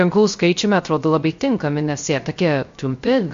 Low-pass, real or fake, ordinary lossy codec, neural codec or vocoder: 7.2 kHz; fake; MP3, 48 kbps; codec, 16 kHz, 0.3 kbps, FocalCodec